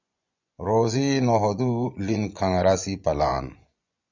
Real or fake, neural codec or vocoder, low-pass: fake; vocoder, 24 kHz, 100 mel bands, Vocos; 7.2 kHz